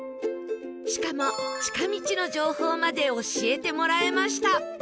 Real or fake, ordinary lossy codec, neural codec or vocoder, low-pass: real; none; none; none